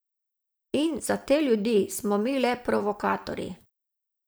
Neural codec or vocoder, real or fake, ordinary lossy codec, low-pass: vocoder, 44.1 kHz, 128 mel bands every 512 samples, BigVGAN v2; fake; none; none